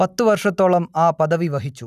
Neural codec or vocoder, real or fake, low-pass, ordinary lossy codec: none; real; 14.4 kHz; none